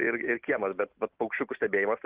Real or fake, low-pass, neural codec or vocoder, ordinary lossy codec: real; 3.6 kHz; none; Opus, 24 kbps